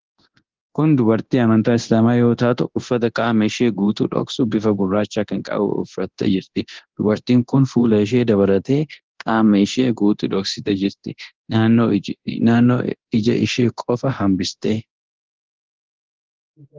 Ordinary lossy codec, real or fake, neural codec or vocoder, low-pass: Opus, 16 kbps; fake; codec, 24 kHz, 0.9 kbps, DualCodec; 7.2 kHz